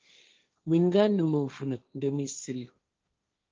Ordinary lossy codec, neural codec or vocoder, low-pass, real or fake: Opus, 24 kbps; codec, 16 kHz, 1.1 kbps, Voila-Tokenizer; 7.2 kHz; fake